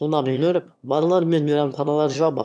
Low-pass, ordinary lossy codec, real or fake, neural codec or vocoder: none; none; fake; autoencoder, 22.05 kHz, a latent of 192 numbers a frame, VITS, trained on one speaker